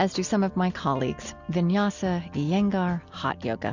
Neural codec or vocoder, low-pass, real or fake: none; 7.2 kHz; real